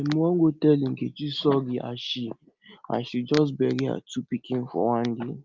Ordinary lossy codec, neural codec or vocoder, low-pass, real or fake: Opus, 24 kbps; none; 7.2 kHz; real